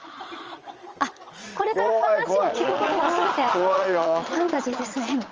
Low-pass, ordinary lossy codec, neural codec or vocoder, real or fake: 7.2 kHz; Opus, 24 kbps; vocoder, 22.05 kHz, 80 mel bands, Vocos; fake